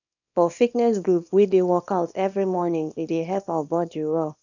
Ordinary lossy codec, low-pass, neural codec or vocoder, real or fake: none; 7.2 kHz; codec, 24 kHz, 0.9 kbps, WavTokenizer, small release; fake